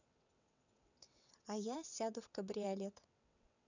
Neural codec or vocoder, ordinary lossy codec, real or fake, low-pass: vocoder, 22.05 kHz, 80 mel bands, WaveNeXt; none; fake; 7.2 kHz